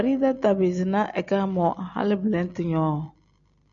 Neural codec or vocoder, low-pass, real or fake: none; 7.2 kHz; real